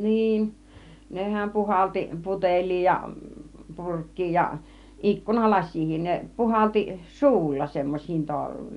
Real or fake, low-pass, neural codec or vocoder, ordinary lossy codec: real; 10.8 kHz; none; none